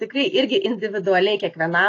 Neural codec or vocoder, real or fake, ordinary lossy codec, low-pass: none; real; AAC, 32 kbps; 7.2 kHz